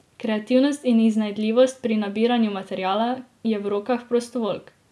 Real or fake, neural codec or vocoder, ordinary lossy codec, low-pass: real; none; none; none